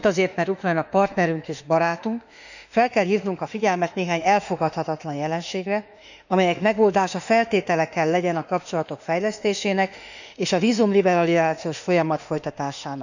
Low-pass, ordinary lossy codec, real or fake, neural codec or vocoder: 7.2 kHz; none; fake; autoencoder, 48 kHz, 32 numbers a frame, DAC-VAE, trained on Japanese speech